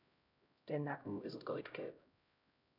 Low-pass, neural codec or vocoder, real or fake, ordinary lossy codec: 5.4 kHz; codec, 16 kHz, 0.5 kbps, X-Codec, HuBERT features, trained on LibriSpeech; fake; none